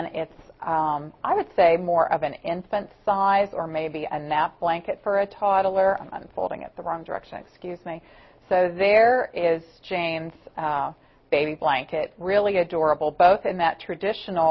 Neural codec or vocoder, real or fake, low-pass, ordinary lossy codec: none; real; 7.2 kHz; MP3, 24 kbps